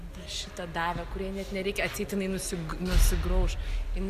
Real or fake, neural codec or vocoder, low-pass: real; none; 14.4 kHz